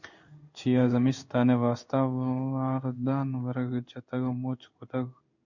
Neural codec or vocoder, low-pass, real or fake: codec, 16 kHz in and 24 kHz out, 1 kbps, XY-Tokenizer; 7.2 kHz; fake